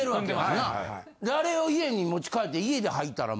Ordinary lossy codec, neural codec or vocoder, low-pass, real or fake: none; none; none; real